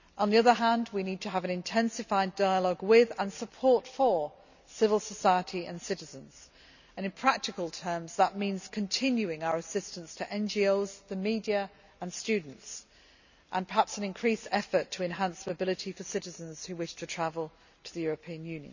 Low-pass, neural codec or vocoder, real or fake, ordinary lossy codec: 7.2 kHz; none; real; none